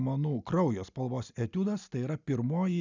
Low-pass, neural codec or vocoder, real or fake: 7.2 kHz; none; real